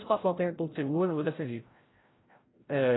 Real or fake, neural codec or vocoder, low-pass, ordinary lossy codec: fake; codec, 16 kHz, 0.5 kbps, FreqCodec, larger model; 7.2 kHz; AAC, 16 kbps